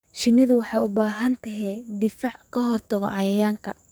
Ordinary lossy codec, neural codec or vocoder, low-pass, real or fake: none; codec, 44.1 kHz, 2.6 kbps, SNAC; none; fake